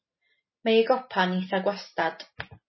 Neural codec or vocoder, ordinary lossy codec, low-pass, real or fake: none; MP3, 24 kbps; 7.2 kHz; real